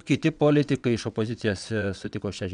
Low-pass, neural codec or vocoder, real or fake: 9.9 kHz; vocoder, 22.05 kHz, 80 mel bands, WaveNeXt; fake